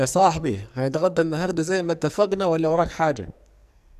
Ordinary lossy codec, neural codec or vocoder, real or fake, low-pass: none; codec, 44.1 kHz, 2.6 kbps, SNAC; fake; 14.4 kHz